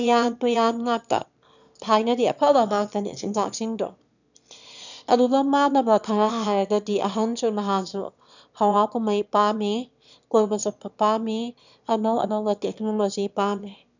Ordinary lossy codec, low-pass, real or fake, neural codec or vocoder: none; 7.2 kHz; fake; autoencoder, 22.05 kHz, a latent of 192 numbers a frame, VITS, trained on one speaker